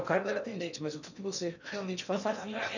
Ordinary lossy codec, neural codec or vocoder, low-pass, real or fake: none; codec, 16 kHz in and 24 kHz out, 0.8 kbps, FocalCodec, streaming, 65536 codes; 7.2 kHz; fake